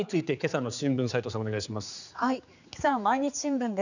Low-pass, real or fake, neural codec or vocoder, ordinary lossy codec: 7.2 kHz; fake; codec, 16 kHz, 4 kbps, X-Codec, HuBERT features, trained on general audio; none